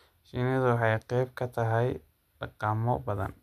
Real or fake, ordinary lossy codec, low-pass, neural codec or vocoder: real; none; 14.4 kHz; none